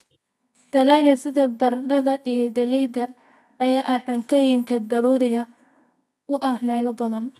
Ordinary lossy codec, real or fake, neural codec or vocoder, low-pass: none; fake; codec, 24 kHz, 0.9 kbps, WavTokenizer, medium music audio release; none